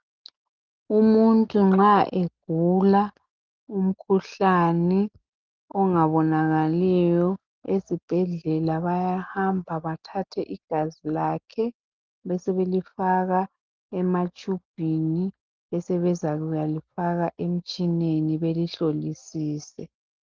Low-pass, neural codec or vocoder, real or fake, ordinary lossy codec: 7.2 kHz; none; real; Opus, 32 kbps